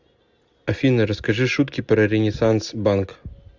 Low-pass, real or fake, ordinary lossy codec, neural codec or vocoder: 7.2 kHz; real; Opus, 64 kbps; none